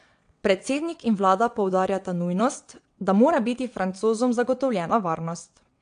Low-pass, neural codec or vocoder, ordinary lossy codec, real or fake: 9.9 kHz; vocoder, 22.05 kHz, 80 mel bands, WaveNeXt; AAC, 64 kbps; fake